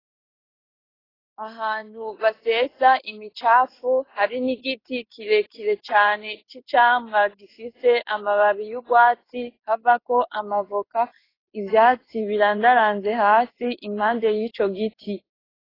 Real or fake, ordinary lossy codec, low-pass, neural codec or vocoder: fake; AAC, 24 kbps; 5.4 kHz; codec, 16 kHz in and 24 kHz out, 1 kbps, XY-Tokenizer